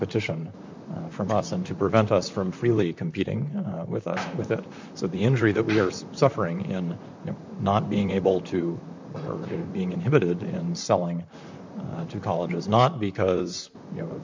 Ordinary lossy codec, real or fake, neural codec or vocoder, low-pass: MP3, 64 kbps; fake; vocoder, 44.1 kHz, 128 mel bands, Pupu-Vocoder; 7.2 kHz